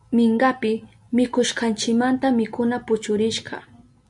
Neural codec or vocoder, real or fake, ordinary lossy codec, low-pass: none; real; MP3, 96 kbps; 10.8 kHz